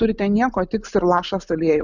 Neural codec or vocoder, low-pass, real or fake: none; 7.2 kHz; real